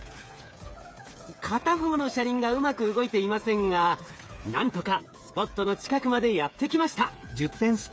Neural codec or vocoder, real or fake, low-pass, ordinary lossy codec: codec, 16 kHz, 8 kbps, FreqCodec, smaller model; fake; none; none